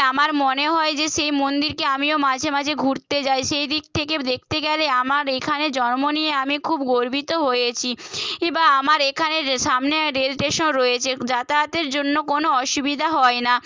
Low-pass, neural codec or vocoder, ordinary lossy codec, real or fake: 7.2 kHz; none; Opus, 32 kbps; real